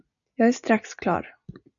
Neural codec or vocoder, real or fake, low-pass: none; real; 7.2 kHz